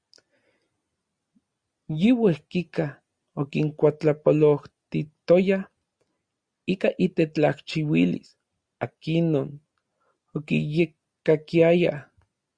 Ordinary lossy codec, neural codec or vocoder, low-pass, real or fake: Opus, 64 kbps; none; 9.9 kHz; real